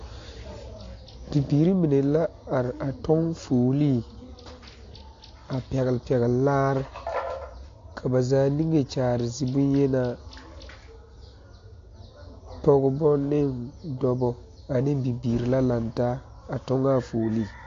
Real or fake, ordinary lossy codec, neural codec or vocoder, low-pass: real; AAC, 48 kbps; none; 7.2 kHz